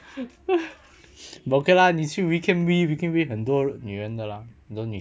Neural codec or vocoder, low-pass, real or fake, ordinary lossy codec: none; none; real; none